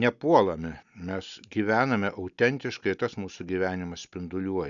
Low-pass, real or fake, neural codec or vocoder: 7.2 kHz; real; none